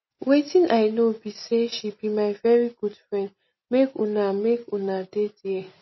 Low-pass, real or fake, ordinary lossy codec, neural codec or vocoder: 7.2 kHz; real; MP3, 24 kbps; none